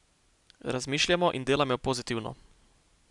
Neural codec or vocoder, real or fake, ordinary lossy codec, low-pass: none; real; none; 10.8 kHz